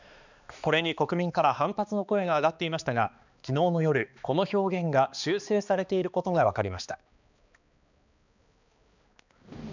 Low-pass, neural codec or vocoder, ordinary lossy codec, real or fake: 7.2 kHz; codec, 16 kHz, 2 kbps, X-Codec, HuBERT features, trained on balanced general audio; none; fake